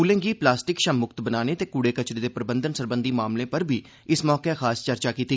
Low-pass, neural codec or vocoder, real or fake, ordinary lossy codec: none; none; real; none